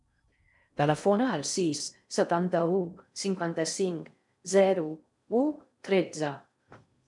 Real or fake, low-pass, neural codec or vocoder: fake; 10.8 kHz; codec, 16 kHz in and 24 kHz out, 0.6 kbps, FocalCodec, streaming, 4096 codes